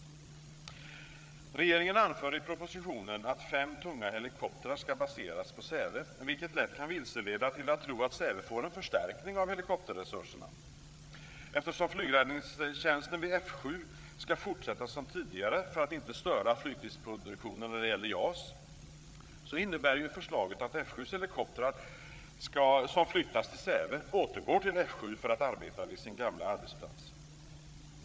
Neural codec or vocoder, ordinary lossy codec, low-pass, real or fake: codec, 16 kHz, 8 kbps, FreqCodec, larger model; none; none; fake